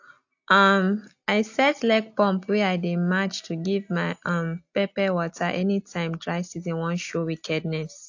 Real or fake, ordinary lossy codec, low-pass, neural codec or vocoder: real; AAC, 48 kbps; 7.2 kHz; none